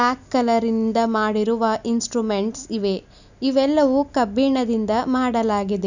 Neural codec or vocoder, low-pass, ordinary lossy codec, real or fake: none; 7.2 kHz; none; real